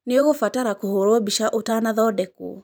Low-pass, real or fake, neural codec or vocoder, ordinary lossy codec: none; fake; vocoder, 44.1 kHz, 128 mel bands every 512 samples, BigVGAN v2; none